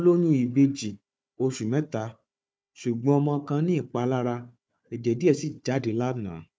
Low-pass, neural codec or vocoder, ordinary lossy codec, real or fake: none; codec, 16 kHz, 4 kbps, FunCodec, trained on Chinese and English, 50 frames a second; none; fake